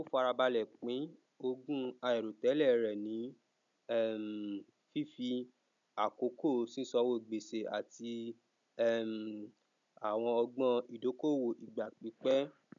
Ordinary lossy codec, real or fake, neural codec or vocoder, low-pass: none; real; none; 7.2 kHz